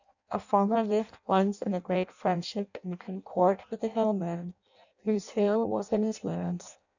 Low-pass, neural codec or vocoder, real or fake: 7.2 kHz; codec, 16 kHz in and 24 kHz out, 0.6 kbps, FireRedTTS-2 codec; fake